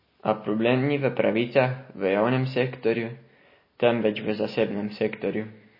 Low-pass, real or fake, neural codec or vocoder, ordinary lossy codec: 5.4 kHz; real; none; MP3, 24 kbps